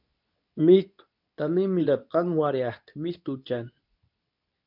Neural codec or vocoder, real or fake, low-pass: codec, 24 kHz, 0.9 kbps, WavTokenizer, medium speech release version 2; fake; 5.4 kHz